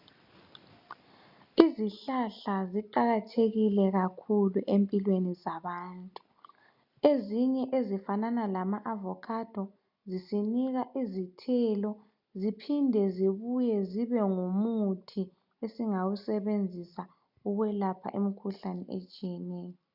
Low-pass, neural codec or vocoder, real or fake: 5.4 kHz; none; real